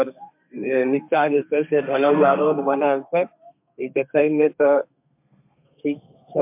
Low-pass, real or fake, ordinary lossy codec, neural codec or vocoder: 3.6 kHz; fake; MP3, 32 kbps; codec, 32 kHz, 1.9 kbps, SNAC